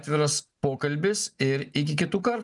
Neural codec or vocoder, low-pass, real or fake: none; 10.8 kHz; real